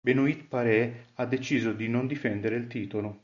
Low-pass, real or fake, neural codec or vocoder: 7.2 kHz; real; none